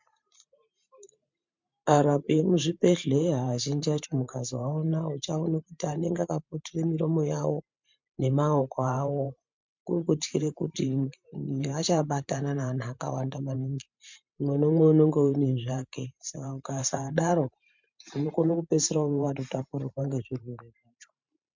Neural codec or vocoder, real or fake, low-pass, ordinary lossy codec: vocoder, 44.1 kHz, 128 mel bands every 512 samples, BigVGAN v2; fake; 7.2 kHz; MP3, 64 kbps